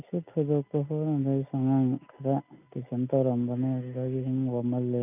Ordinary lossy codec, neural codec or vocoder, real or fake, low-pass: none; none; real; 3.6 kHz